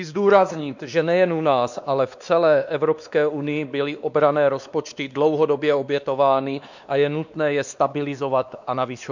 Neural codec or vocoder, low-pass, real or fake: codec, 16 kHz, 2 kbps, X-Codec, WavLM features, trained on Multilingual LibriSpeech; 7.2 kHz; fake